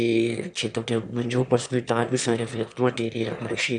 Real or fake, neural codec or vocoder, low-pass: fake; autoencoder, 22.05 kHz, a latent of 192 numbers a frame, VITS, trained on one speaker; 9.9 kHz